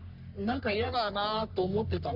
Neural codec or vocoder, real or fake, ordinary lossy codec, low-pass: codec, 44.1 kHz, 3.4 kbps, Pupu-Codec; fake; AAC, 48 kbps; 5.4 kHz